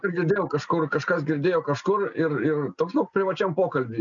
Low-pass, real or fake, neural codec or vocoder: 7.2 kHz; real; none